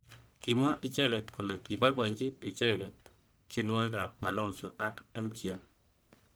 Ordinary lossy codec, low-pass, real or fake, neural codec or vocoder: none; none; fake; codec, 44.1 kHz, 1.7 kbps, Pupu-Codec